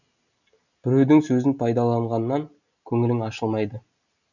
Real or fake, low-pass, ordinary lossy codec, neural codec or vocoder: real; 7.2 kHz; none; none